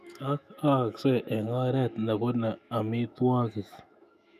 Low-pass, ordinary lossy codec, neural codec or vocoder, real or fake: 14.4 kHz; none; autoencoder, 48 kHz, 128 numbers a frame, DAC-VAE, trained on Japanese speech; fake